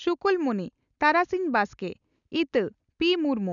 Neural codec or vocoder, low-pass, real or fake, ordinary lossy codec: none; 7.2 kHz; real; none